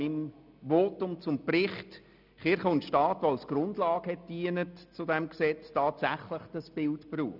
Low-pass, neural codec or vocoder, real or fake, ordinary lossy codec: 5.4 kHz; none; real; none